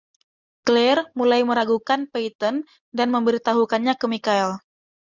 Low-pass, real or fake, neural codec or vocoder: 7.2 kHz; real; none